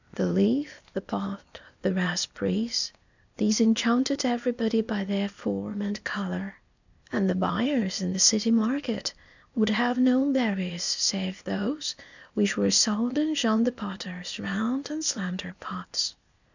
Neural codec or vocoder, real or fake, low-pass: codec, 16 kHz, 0.8 kbps, ZipCodec; fake; 7.2 kHz